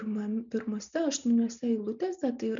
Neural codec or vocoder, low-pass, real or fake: none; 7.2 kHz; real